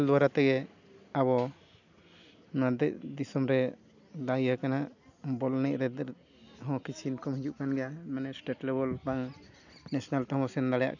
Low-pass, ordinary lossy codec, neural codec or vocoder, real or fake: 7.2 kHz; none; none; real